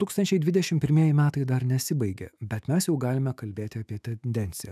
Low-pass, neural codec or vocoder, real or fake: 14.4 kHz; autoencoder, 48 kHz, 128 numbers a frame, DAC-VAE, trained on Japanese speech; fake